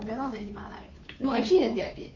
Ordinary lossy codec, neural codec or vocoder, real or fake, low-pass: MP3, 48 kbps; codec, 16 kHz, 2 kbps, FunCodec, trained on Chinese and English, 25 frames a second; fake; 7.2 kHz